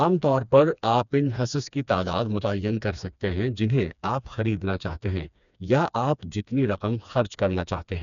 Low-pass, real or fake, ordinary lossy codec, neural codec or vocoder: 7.2 kHz; fake; none; codec, 16 kHz, 2 kbps, FreqCodec, smaller model